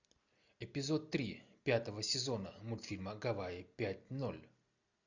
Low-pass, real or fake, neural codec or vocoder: 7.2 kHz; real; none